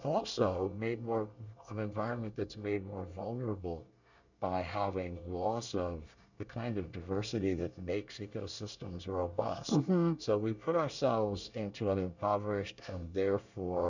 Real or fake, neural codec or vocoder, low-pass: fake; codec, 24 kHz, 1 kbps, SNAC; 7.2 kHz